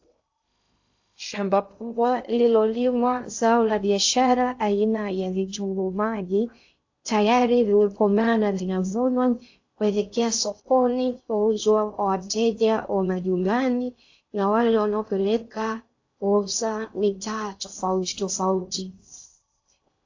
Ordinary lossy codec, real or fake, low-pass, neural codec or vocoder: AAC, 48 kbps; fake; 7.2 kHz; codec, 16 kHz in and 24 kHz out, 0.6 kbps, FocalCodec, streaming, 2048 codes